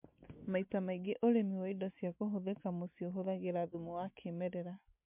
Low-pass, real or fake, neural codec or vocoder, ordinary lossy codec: 3.6 kHz; real; none; MP3, 32 kbps